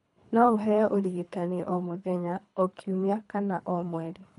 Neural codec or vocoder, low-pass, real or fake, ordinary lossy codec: codec, 24 kHz, 3 kbps, HILCodec; 10.8 kHz; fake; none